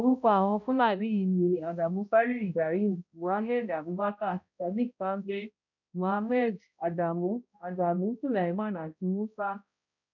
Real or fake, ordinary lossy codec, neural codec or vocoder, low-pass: fake; none; codec, 16 kHz, 0.5 kbps, X-Codec, HuBERT features, trained on balanced general audio; 7.2 kHz